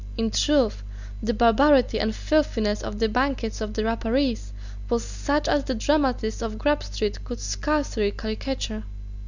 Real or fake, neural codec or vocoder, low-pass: real; none; 7.2 kHz